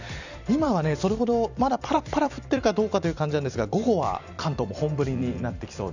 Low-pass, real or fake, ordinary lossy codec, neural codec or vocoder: 7.2 kHz; fake; none; vocoder, 44.1 kHz, 128 mel bands every 256 samples, BigVGAN v2